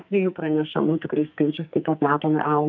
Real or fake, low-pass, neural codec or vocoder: fake; 7.2 kHz; codec, 44.1 kHz, 2.6 kbps, SNAC